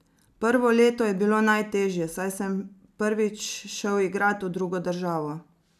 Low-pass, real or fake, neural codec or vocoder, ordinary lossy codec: 14.4 kHz; real; none; none